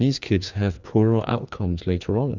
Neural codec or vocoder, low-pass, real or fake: codec, 16 kHz, 2 kbps, FreqCodec, larger model; 7.2 kHz; fake